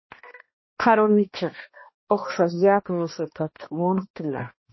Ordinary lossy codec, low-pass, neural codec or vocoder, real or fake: MP3, 24 kbps; 7.2 kHz; codec, 16 kHz, 1 kbps, X-Codec, HuBERT features, trained on balanced general audio; fake